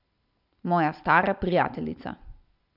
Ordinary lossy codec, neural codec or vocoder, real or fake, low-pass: none; none; real; 5.4 kHz